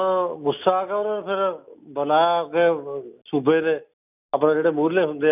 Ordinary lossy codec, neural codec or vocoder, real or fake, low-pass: none; none; real; 3.6 kHz